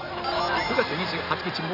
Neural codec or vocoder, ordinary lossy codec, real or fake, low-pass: none; Opus, 64 kbps; real; 5.4 kHz